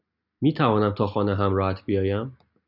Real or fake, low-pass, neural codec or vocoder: real; 5.4 kHz; none